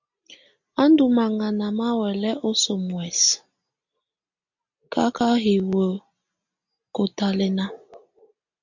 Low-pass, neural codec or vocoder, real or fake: 7.2 kHz; none; real